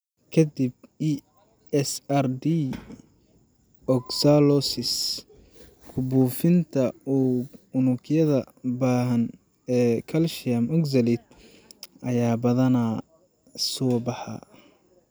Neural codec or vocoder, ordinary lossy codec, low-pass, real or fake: none; none; none; real